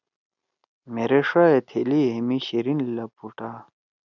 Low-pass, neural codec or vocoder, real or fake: 7.2 kHz; none; real